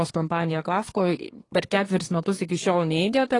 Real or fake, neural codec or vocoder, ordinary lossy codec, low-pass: fake; codec, 24 kHz, 1 kbps, SNAC; AAC, 32 kbps; 10.8 kHz